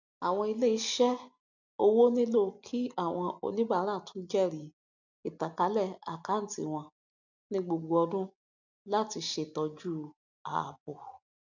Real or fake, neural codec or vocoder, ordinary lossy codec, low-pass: real; none; none; 7.2 kHz